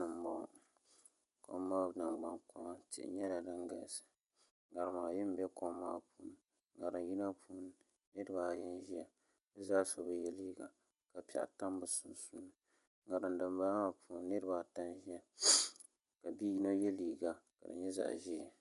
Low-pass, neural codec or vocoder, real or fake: 10.8 kHz; vocoder, 24 kHz, 100 mel bands, Vocos; fake